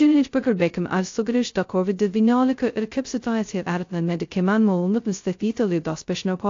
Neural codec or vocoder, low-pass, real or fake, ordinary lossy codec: codec, 16 kHz, 0.2 kbps, FocalCodec; 7.2 kHz; fake; AAC, 48 kbps